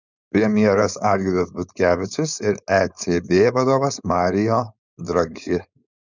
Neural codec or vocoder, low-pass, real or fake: codec, 16 kHz, 4.8 kbps, FACodec; 7.2 kHz; fake